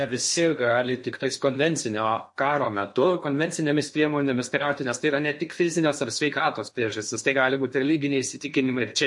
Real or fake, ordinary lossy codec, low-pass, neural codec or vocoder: fake; MP3, 48 kbps; 10.8 kHz; codec, 16 kHz in and 24 kHz out, 0.8 kbps, FocalCodec, streaming, 65536 codes